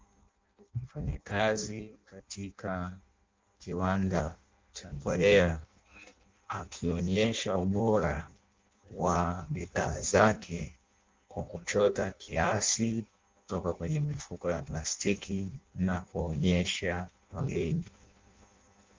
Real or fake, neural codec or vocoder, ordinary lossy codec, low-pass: fake; codec, 16 kHz in and 24 kHz out, 0.6 kbps, FireRedTTS-2 codec; Opus, 24 kbps; 7.2 kHz